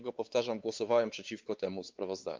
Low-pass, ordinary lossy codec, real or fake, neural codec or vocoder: 7.2 kHz; Opus, 32 kbps; fake; codec, 16 kHz, 4 kbps, X-Codec, WavLM features, trained on Multilingual LibriSpeech